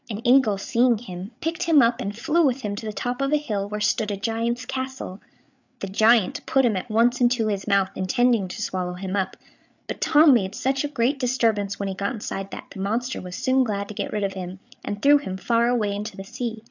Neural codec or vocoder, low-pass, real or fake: codec, 16 kHz, 8 kbps, FreqCodec, larger model; 7.2 kHz; fake